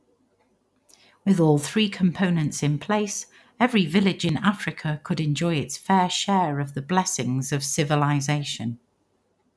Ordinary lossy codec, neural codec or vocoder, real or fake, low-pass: none; none; real; none